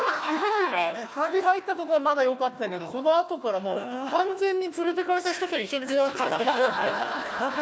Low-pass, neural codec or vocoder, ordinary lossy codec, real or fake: none; codec, 16 kHz, 1 kbps, FunCodec, trained on Chinese and English, 50 frames a second; none; fake